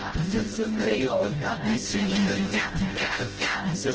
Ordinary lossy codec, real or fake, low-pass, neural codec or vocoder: Opus, 16 kbps; fake; 7.2 kHz; codec, 16 kHz, 0.5 kbps, FreqCodec, smaller model